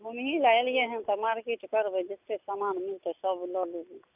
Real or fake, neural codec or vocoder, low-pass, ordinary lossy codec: real; none; 3.6 kHz; none